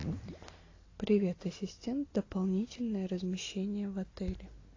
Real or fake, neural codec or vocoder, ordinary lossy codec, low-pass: real; none; AAC, 32 kbps; 7.2 kHz